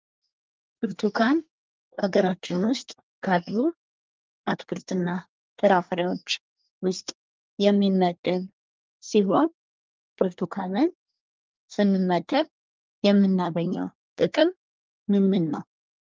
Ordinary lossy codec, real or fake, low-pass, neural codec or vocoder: Opus, 24 kbps; fake; 7.2 kHz; codec, 24 kHz, 1 kbps, SNAC